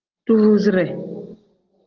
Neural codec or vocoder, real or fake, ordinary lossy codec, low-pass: none; real; Opus, 16 kbps; 7.2 kHz